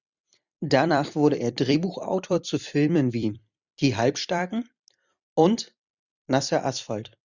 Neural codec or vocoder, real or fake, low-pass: vocoder, 44.1 kHz, 128 mel bands every 256 samples, BigVGAN v2; fake; 7.2 kHz